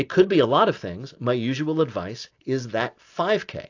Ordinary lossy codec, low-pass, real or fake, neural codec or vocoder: AAC, 48 kbps; 7.2 kHz; real; none